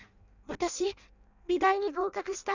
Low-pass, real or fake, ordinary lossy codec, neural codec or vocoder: 7.2 kHz; fake; none; codec, 16 kHz in and 24 kHz out, 0.6 kbps, FireRedTTS-2 codec